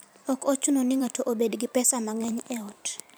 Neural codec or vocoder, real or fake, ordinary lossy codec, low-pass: vocoder, 44.1 kHz, 128 mel bands every 512 samples, BigVGAN v2; fake; none; none